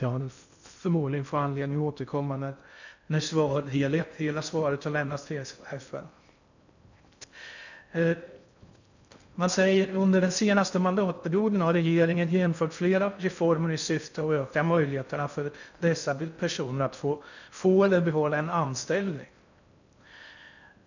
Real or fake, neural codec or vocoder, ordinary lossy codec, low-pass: fake; codec, 16 kHz in and 24 kHz out, 0.6 kbps, FocalCodec, streaming, 2048 codes; none; 7.2 kHz